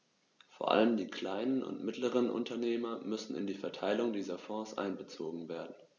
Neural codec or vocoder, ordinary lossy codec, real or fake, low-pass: none; none; real; 7.2 kHz